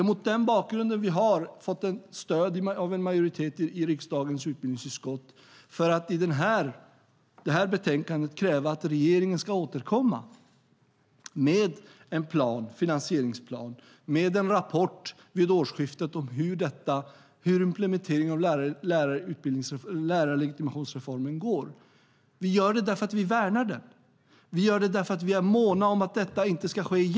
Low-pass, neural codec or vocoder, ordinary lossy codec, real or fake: none; none; none; real